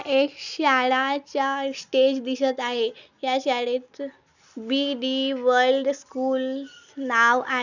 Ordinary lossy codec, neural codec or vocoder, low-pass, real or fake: none; none; 7.2 kHz; real